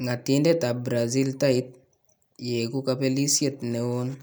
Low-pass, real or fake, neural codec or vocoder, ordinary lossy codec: none; real; none; none